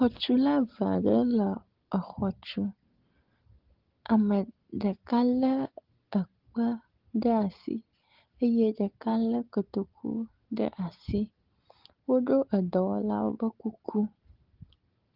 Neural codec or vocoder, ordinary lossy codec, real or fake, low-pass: none; Opus, 16 kbps; real; 5.4 kHz